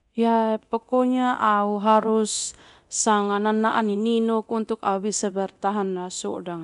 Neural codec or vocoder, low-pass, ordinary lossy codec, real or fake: codec, 24 kHz, 0.9 kbps, DualCodec; 10.8 kHz; none; fake